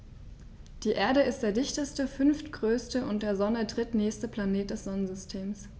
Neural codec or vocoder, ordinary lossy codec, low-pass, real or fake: none; none; none; real